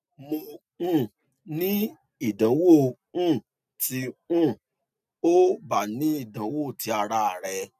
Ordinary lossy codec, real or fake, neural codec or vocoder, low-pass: none; real; none; 14.4 kHz